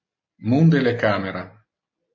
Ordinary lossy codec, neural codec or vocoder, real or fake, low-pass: MP3, 32 kbps; none; real; 7.2 kHz